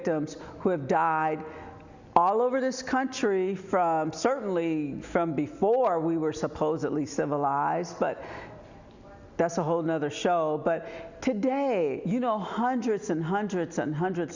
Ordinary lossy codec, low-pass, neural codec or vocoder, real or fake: Opus, 64 kbps; 7.2 kHz; none; real